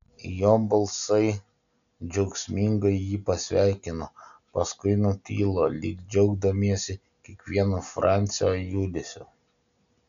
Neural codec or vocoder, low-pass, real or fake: none; 7.2 kHz; real